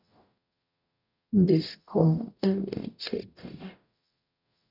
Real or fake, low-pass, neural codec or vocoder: fake; 5.4 kHz; codec, 44.1 kHz, 0.9 kbps, DAC